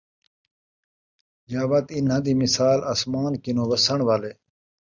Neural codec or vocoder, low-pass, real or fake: none; 7.2 kHz; real